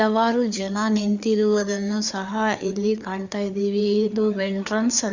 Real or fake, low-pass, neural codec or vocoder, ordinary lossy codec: fake; 7.2 kHz; codec, 16 kHz, 4 kbps, FreqCodec, larger model; none